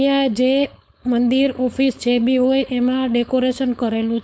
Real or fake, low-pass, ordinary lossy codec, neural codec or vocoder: fake; none; none; codec, 16 kHz, 4.8 kbps, FACodec